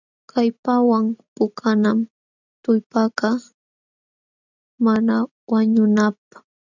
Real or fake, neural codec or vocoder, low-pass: real; none; 7.2 kHz